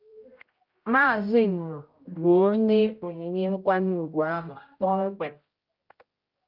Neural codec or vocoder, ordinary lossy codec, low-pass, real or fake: codec, 16 kHz, 0.5 kbps, X-Codec, HuBERT features, trained on general audio; Opus, 24 kbps; 5.4 kHz; fake